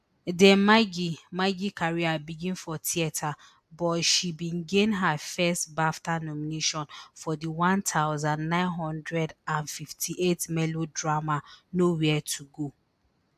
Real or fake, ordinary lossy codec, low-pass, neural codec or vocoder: real; AAC, 96 kbps; 14.4 kHz; none